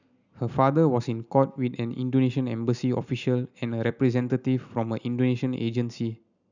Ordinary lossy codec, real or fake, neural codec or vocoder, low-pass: none; real; none; 7.2 kHz